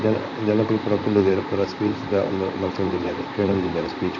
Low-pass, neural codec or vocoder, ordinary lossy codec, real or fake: 7.2 kHz; vocoder, 44.1 kHz, 128 mel bands, Pupu-Vocoder; none; fake